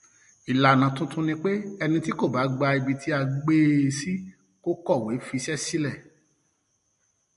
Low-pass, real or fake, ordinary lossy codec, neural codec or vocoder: 14.4 kHz; real; MP3, 48 kbps; none